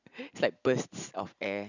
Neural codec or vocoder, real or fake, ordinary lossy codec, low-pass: none; real; none; 7.2 kHz